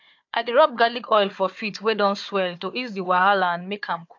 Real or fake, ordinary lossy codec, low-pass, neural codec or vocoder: fake; AAC, 48 kbps; 7.2 kHz; codec, 16 kHz, 4 kbps, FunCodec, trained on Chinese and English, 50 frames a second